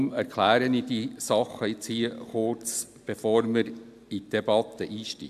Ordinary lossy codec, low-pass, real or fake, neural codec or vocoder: AAC, 96 kbps; 14.4 kHz; real; none